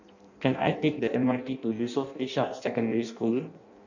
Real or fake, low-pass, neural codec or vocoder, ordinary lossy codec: fake; 7.2 kHz; codec, 16 kHz in and 24 kHz out, 0.6 kbps, FireRedTTS-2 codec; none